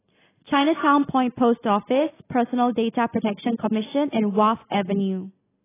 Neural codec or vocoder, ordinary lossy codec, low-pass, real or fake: none; AAC, 16 kbps; 3.6 kHz; real